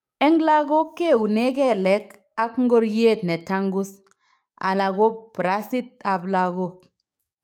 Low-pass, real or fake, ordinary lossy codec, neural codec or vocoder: 19.8 kHz; fake; none; codec, 44.1 kHz, 7.8 kbps, DAC